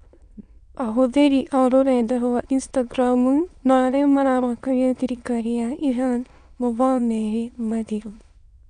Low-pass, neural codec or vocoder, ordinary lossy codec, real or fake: 9.9 kHz; autoencoder, 22.05 kHz, a latent of 192 numbers a frame, VITS, trained on many speakers; none; fake